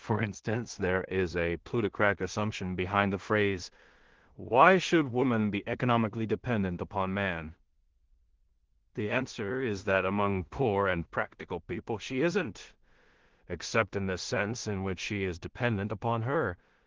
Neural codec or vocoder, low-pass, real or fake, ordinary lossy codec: codec, 16 kHz in and 24 kHz out, 0.4 kbps, LongCat-Audio-Codec, two codebook decoder; 7.2 kHz; fake; Opus, 16 kbps